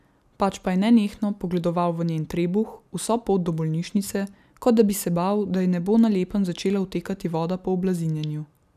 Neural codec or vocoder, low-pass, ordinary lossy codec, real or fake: none; 14.4 kHz; none; real